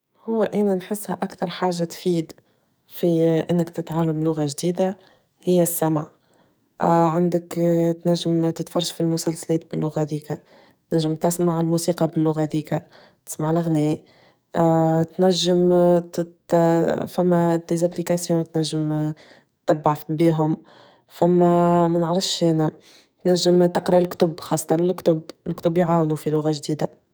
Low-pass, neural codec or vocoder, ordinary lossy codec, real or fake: none; codec, 44.1 kHz, 2.6 kbps, SNAC; none; fake